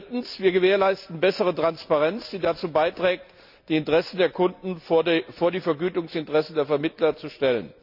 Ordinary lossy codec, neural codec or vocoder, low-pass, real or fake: none; none; 5.4 kHz; real